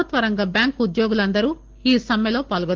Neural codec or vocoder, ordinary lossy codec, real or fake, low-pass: none; Opus, 16 kbps; real; 7.2 kHz